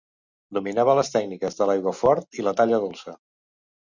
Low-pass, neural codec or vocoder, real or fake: 7.2 kHz; none; real